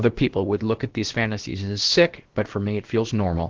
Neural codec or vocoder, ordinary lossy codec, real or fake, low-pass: codec, 16 kHz, about 1 kbps, DyCAST, with the encoder's durations; Opus, 16 kbps; fake; 7.2 kHz